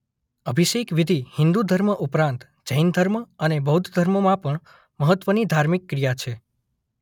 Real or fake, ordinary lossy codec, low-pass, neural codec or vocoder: real; none; 19.8 kHz; none